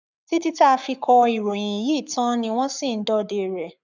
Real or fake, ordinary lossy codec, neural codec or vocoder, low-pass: fake; none; codec, 44.1 kHz, 7.8 kbps, Pupu-Codec; 7.2 kHz